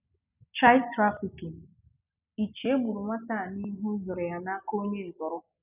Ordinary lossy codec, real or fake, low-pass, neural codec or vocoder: none; real; 3.6 kHz; none